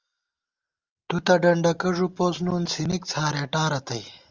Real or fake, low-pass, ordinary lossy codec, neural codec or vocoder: real; 7.2 kHz; Opus, 32 kbps; none